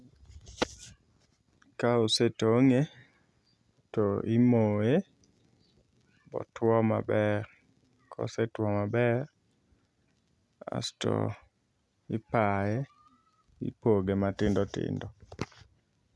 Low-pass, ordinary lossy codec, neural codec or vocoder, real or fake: none; none; none; real